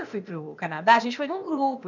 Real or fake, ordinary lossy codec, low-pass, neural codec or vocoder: fake; none; 7.2 kHz; codec, 16 kHz, 0.8 kbps, ZipCodec